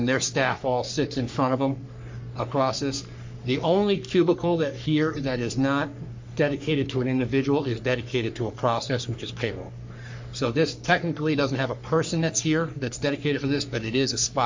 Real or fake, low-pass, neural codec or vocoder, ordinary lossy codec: fake; 7.2 kHz; codec, 44.1 kHz, 3.4 kbps, Pupu-Codec; MP3, 48 kbps